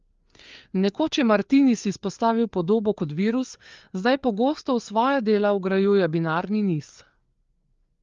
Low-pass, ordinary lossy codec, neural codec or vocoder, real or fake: 7.2 kHz; Opus, 32 kbps; codec, 16 kHz, 4 kbps, FreqCodec, larger model; fake